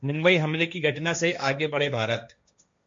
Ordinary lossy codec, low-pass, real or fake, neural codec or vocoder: MP3, 48 kbps; 7.2 kHz; fake; codec, 16 kHz, 1.1 kbps, Voila-Tokenizer